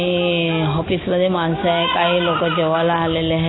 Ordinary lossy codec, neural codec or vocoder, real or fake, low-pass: AAC, 16 kbps; none; real; 7.2 kHz